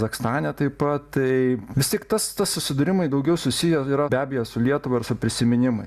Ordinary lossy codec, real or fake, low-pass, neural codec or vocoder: Opus, 64 kbps; real; 14.4 kHz; none